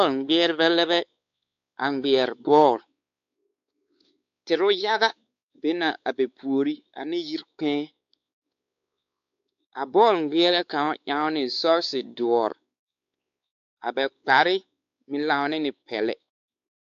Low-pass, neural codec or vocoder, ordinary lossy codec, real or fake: 7.2 kHz; codec, 16 kHz, 4 kbps, X-Codec, WavLM features, trained on Multilingual LibriSpeech; AAC, 64 kbps; fake